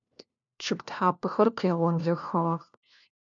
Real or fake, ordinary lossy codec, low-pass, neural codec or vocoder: fake; MP3, 64 kbps; 7.2 kHz; codec, 16 kHz, 1 kbps, FunCodec, trained on LibriTTS, 50 frames a second